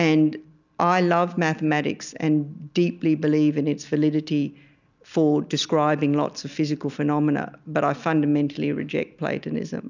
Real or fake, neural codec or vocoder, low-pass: real; none; 7.2 kHz